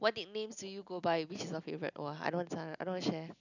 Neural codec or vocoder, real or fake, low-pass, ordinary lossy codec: none; real; 7.2 kHz; none